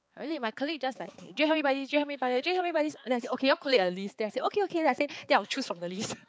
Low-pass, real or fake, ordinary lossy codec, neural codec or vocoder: none; fake; none; codec, 16 kHz, 4 kbps, X-Codec, HuBERT features, trained on balanced general audio